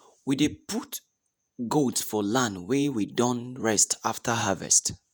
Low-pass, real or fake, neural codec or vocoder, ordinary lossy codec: none; fake; vocoder, 48 kHz, 128 mel bands, Vocos; none